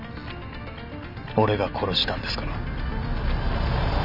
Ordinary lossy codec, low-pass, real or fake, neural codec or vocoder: none; 5.4 kHz; real; none